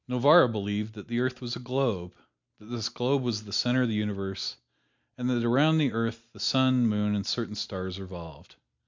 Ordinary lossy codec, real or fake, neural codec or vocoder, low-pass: MP3, 64 kbps; real; none; 7.2 kHz